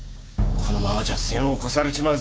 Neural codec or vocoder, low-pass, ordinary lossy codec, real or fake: codec, 16 kHz, 6 kbps, DAC; none; none; fake